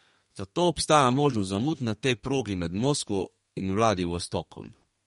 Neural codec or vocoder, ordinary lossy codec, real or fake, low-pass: codec, 32 kHz, 1.9 kbps, SNAC; MP3, 48 kbps; fake; 14.4 kHz